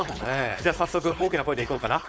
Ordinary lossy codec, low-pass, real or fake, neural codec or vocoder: none; none; fake; codec, 16 kHz, 4.8 kbps, FACodec